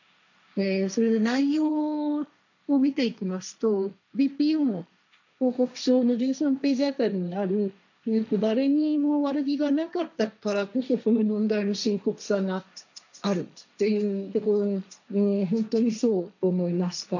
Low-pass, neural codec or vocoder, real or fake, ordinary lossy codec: 7.2 kHz; codec, 16 kHz, 1.1 kbps, Voila-Tokenizer; fake; none